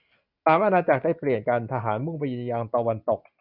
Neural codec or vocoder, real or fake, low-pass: none; real; 5.4 kHz